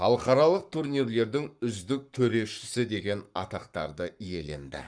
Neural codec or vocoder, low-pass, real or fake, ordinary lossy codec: codec, 44.1 kHz, 7.8 kbps, Pupu-Codec; 9.9 kHz; fake; none